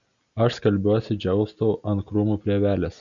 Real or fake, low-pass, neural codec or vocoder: real; 7.2 kHz; none